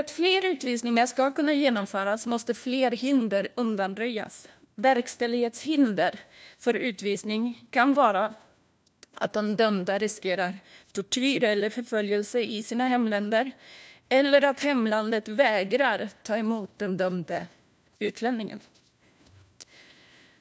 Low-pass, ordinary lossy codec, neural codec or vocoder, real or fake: none; none; codec, 16 kHz, 1 kbps, FunCodec, trained on LibriTTS, 50 frames a second; fake